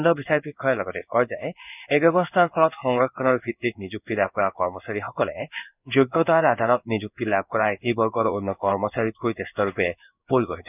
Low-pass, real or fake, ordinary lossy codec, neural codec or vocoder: 3.6 kHz; fake; none; codec, 16 kHz in and 24 kHz out, 1 kbps, XY-Tokenizer